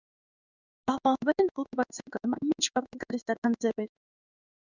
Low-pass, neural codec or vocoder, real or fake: 7.2 kHz; codec, 16 kHz in and 24 kHz out, 1 kbps, XY-Tokenizer; fake